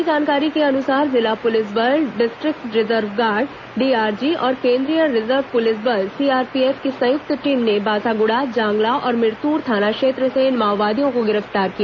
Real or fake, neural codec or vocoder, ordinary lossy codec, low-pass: real; none; none; 7.2 kHz